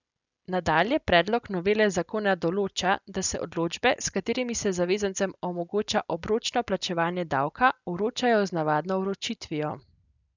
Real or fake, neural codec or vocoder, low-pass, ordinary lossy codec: real; none; 7.2 kHz; none